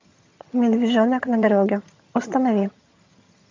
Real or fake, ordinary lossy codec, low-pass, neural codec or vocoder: fake; MP3, 48 kbps; 7.2 kHz; vocoder, 22.05 kHz, 80 mel bands, HiFi-GAN